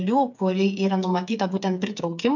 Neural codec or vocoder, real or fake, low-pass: codec, 16 kHz, 8 kbps, FreqCodec, smaller model; fake; 7.2 kHz